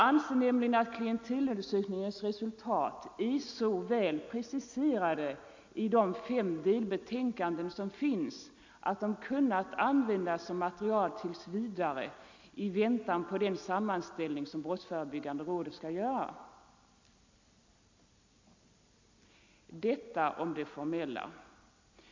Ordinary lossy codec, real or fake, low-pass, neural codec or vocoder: MP3, 64 kbps; real; 7.2 kHz; none